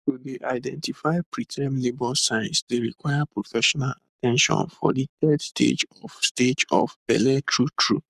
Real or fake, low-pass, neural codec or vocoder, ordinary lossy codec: fake; 14.4 kHz; codec, 44.1 kHz, 7.8 kbps, Pupu-Codec; none